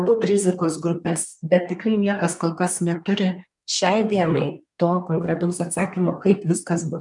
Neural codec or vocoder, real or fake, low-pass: codec, 24 kHz, 1 kbps, SNAC; fake; 10.8 kHz